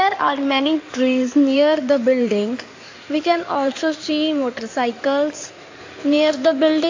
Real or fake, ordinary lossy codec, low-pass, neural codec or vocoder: fake; AAC, 48 kbps; 7.2 kHz; codec, 16 kHz in and 24 kHz out, 2.2 kbps, FireRedTTS-2 codec